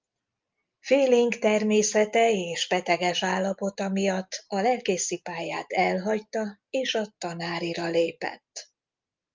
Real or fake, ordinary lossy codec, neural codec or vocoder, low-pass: real; Opus, 24 kbps; none; 7.2 kHz